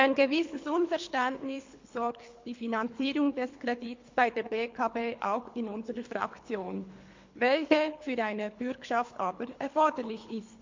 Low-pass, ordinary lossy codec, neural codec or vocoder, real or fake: 7.2 kHz; MP3, 48 kbps; codec, 24 kHz, 3 kbps, HILCodec; fake